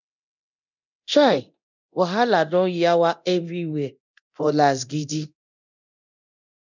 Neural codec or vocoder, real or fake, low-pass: codec, 24 kHz, 0.9 kbps, DualCodec; fake; 7.2 kHz